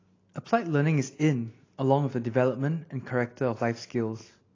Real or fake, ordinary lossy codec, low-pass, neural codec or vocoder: real; AAC, 32 kbps; 7.2 kHz; none